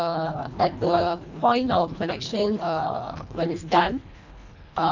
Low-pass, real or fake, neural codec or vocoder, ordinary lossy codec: 7.2 kHz; fake; codec, 24 kHz, 1.5 kbps, HILCodec; none